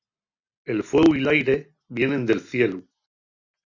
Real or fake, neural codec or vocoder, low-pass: real; none; 7.2 kHz